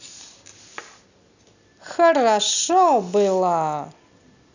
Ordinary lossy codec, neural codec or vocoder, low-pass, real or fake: none; none; 7.2 kHz; real